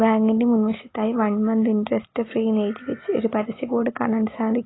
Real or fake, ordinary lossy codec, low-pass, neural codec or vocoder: real; AAC, 16 kbps; 7.2 kHz; none